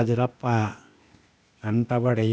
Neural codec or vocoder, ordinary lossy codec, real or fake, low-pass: codec, 16 kHz, 0.8 kbps, ZipCodec; none; fake; none